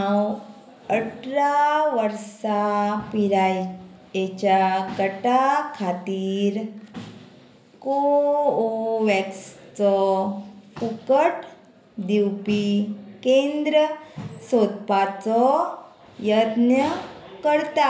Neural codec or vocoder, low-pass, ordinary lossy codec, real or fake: none; none; none; real